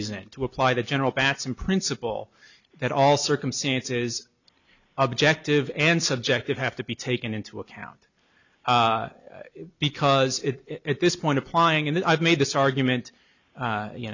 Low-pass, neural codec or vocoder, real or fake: 7.2 kHz; none; real